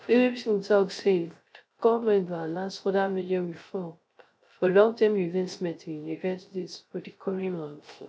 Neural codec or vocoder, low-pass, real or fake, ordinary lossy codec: codec, 16 kHz, 0.3 kbps, FocalCodec; none; fake; none